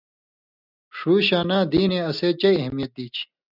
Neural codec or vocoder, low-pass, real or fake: none; 5.4 kHz; real